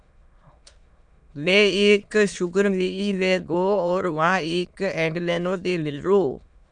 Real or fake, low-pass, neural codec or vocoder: fake; 9.9 kHz; autoencoder, 22.05 kHz, a latent of 192 numbers a frame, VITS, trained on many speakers